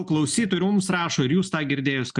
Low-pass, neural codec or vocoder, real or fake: 10.8 kHz; none; real